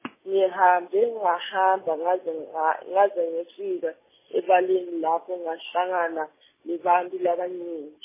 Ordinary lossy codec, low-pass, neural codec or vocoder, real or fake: MP3, 16 kbps; 3.6 kHz; none; real